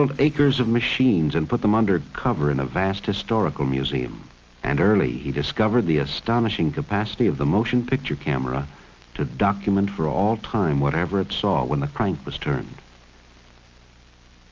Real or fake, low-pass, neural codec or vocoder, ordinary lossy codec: real; 7.2 kHz; none; Opus, 24 kbps